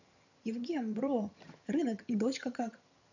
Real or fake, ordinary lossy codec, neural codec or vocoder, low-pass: fake; none; vocoder, 22.05 kHz, 80 mel bands, HiFi-GAN; 7.2 kHz